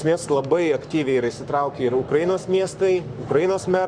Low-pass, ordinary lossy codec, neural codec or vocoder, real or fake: 9.9 kHz; Opus, 64 kbps; codec, 44.1 kHz, 7.8 kbps, Pupu-Codec; fake